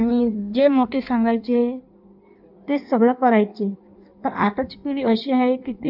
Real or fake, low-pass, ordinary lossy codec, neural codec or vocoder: fake; 5.4 kHz; none; codec, 16 kHz in and 24 kHz out, 1.1 kbps, FireRedTTS-2 codec